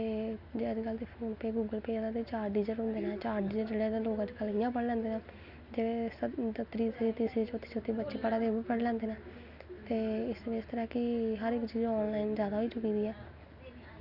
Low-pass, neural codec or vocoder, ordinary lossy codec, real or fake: 5.4 kHz; none; none; real